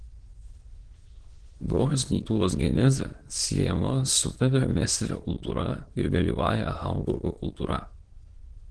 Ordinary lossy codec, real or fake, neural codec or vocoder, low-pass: Opus, 16 kbps; fake; autoencoder, 22.05 kHz, a latent of 192 numbers a frame, VITS, trained on many speakers; 9.9 kHz